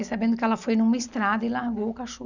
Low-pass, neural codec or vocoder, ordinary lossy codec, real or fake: 7.2 kHz; none; none; real